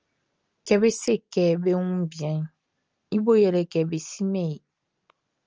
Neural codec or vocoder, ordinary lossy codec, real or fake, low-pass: none; Opus, 24 kbps; real; 7.2 kHz